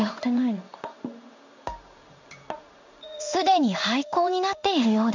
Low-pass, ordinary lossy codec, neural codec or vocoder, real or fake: 7.2 kHz; none; codec, 16 kHz in and 24 kHz out, 1 kbps, XY-Tokenizer; fake